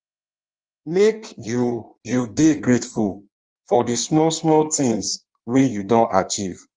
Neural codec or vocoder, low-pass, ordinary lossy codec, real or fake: codec, 16 kHz in and 24 kHz out, 1.1 kbps, FireRedTTS-2 codec; 9.9 kHz; Opus, 24 kbps; fake